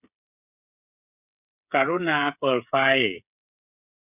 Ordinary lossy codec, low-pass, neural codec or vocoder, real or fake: none; 3.6 kHz; codec, 16 kHz, 8 kbps, FreqCodec, smaller model; fake